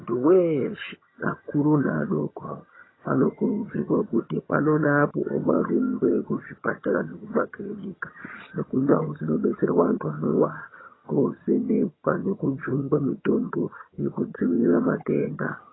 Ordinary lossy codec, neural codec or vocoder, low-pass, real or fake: AAC, 16 kbps; vocoder, 22.05 kHz, 80 mel bands, HiFi-GAN; 7.2 kHz; fake